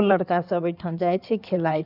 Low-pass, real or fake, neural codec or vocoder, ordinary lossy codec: 5.4 kHz; fake; codec, 16 kHz in and 24 kHz out, 2.2 kbps, FireRedTTS-2 codec; none